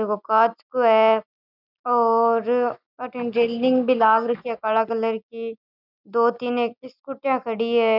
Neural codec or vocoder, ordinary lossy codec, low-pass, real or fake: none; none; 5.4 kHz; real